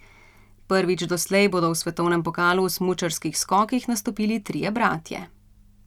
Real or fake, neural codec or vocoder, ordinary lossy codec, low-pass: real; none; none; 19.8 kHz